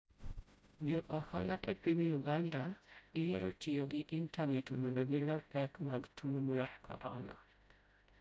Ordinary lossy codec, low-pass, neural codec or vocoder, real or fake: none; none; codec, 16 kHz, 0.5 kbps, FreqCodec, smaller model; fake